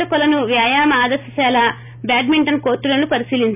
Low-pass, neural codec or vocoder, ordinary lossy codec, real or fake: 3.6 kHz; none; none; real